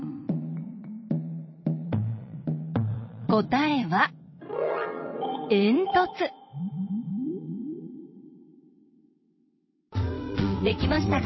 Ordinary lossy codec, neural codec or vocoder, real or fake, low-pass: MP3, 24 kbps; vocoder, 22.05 kHz, 80 mel bands, WaveNeXt; fake; 7.2 kHz